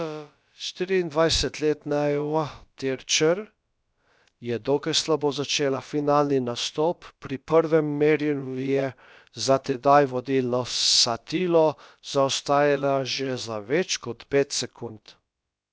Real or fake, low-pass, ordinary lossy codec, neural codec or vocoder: fake; none; none; codec, 16 kHz, about 1 kbps, DyCAST, with the encoder's durations